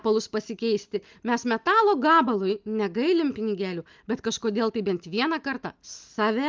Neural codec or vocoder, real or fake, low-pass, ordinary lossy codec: none; real; 7.2 kHz; Opus, 32 kbps